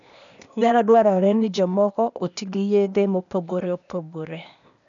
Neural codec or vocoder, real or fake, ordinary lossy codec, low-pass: codec, 16 kHz, 0.8 kbps, ZipCodec; fake; none; 7.2 kHz